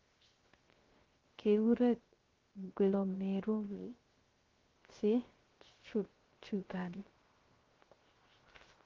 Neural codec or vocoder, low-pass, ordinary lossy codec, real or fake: codec, 16 kHz, 0.3 kbps, FocalCodec; 7.2 kHz; Opus, 16 kbps; fake